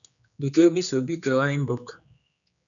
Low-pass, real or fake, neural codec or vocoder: 7.2 kHz; fake; codec, 16 kHz, 2 kbps, X-Codec, HuBERT features, trained on general audio